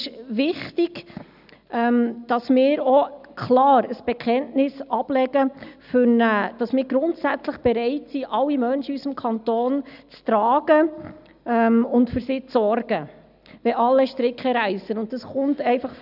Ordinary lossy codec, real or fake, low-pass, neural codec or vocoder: none; real; 5.4 kHz; none